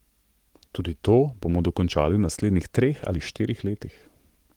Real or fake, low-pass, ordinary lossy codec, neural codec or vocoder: fake; 19.8 kHz; Opus, 24 kbps; codec, 44.1 kHz, 7.8 kbps, Pupu-Codec